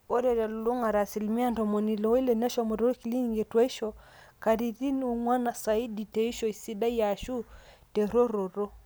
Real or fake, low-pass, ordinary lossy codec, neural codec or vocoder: real; none; none; none